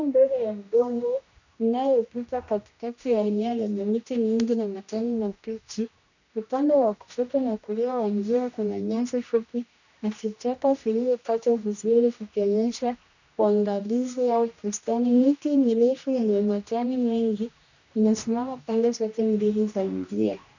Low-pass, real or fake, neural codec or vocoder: 7.2 kHz; fake; codec, 16 kHz, 1 kbps, X-Codec, HuBERT features, trained on general audio